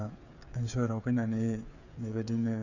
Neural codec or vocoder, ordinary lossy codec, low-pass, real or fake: codec, 16 kHz, 8 kbps, FreqCodec, smaller model; none; 7.2 kHz; fake